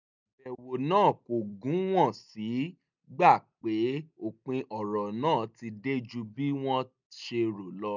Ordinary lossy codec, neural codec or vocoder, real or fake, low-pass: none; none; real; 7.2 kHz